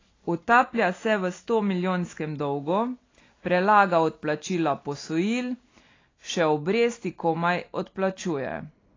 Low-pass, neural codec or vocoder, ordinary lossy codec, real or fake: 7.2 kHz; none; AAC, 32 kbps; real